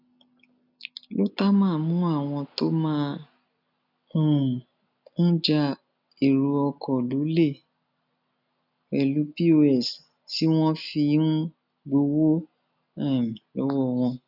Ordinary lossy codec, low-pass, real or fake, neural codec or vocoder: none; 5.4 kHz; real; none